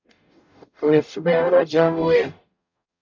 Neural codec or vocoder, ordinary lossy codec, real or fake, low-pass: codec, 44.1 kHz, 0.9 kbps, DAC; MP3, 64 kbps; fake; 7.2 kHz